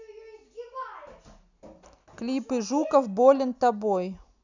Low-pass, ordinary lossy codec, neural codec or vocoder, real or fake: 7.2 kHz; none; none; real